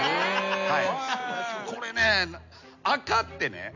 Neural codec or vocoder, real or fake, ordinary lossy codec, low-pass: none; real; none; 7.2 kHz